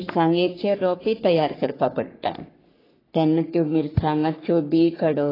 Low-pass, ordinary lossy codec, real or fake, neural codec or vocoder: 5.4 kHz; AAC, 24 kbps; fake; codec, 44.1 kHz, 3.4 kbps, Pupu-Codec